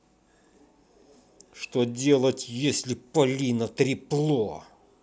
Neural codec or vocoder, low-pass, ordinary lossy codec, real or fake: none; none; none; real